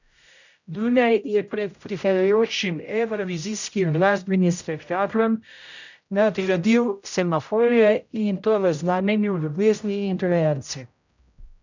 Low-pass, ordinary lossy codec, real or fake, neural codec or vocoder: 7.2 kHz; none; fake; codec, 16 kHz, 0.5 kbps, X-Codec, HuBERT features, trained on general audio